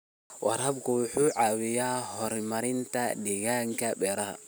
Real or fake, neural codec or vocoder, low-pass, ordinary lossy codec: real; none; none; none